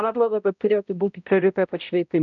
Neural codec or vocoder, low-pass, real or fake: codec, 16 kHz, 0.5 kbps, X-Codec, HuBERT features, trained on balanced general audio; 7.2 kHz; fake